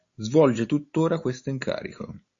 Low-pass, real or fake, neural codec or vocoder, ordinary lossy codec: 7.2 kHz; real; none; AAC, 48 kbps